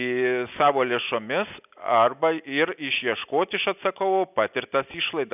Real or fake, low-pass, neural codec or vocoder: real; 3.6 kHz; none